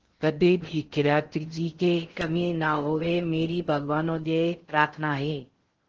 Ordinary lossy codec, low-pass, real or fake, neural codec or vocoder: Opus, 16 kbps; 7.2 kHz; fake; codec, 16 kHz in and 24 kHz out, 0.6 kbps, FocalCodec, streaming, 2048 codes